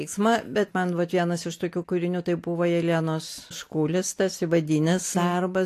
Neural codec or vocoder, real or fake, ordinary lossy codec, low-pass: none; real; AAC, 64 kbps; 14.4 kHz